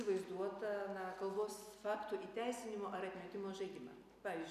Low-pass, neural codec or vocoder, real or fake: 14.4 kHz; none; real